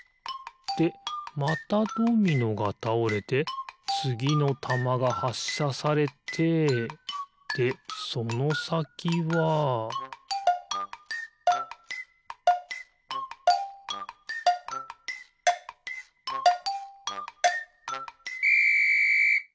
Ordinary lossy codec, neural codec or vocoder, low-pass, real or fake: none; none; none; real